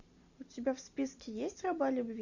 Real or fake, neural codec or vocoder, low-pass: real; none; 7.2 kHz